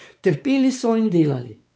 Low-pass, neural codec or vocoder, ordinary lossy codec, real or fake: none; codec, 16 kHz, 4 kbps, X-Codec, WavLM features, trained on Multilingual LibriSpeech; none; fake